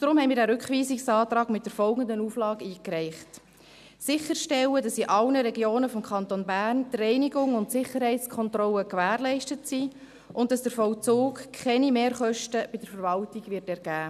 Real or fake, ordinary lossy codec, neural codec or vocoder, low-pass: real; none; none; 14.4 kHz